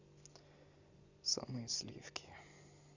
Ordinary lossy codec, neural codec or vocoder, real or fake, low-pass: Opus, 64 kbps; none; real; 7.2 kHz